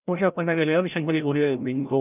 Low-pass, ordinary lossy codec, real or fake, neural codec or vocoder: 3.6 kHz; none; fake; codec, 16 kHz, 0.5 kbps, FreqCodec, larger model